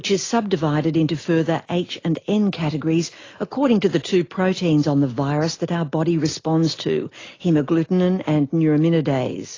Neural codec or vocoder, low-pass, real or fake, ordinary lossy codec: none; 7.2 kHz; real; AAC, 32 kbps